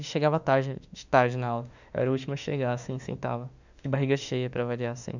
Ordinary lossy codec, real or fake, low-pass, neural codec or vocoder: none; fake; 7.2 kHz; autoencoder, 48 kHz, 32 numbers a frame, DAC-VAE, trained on Japanese speech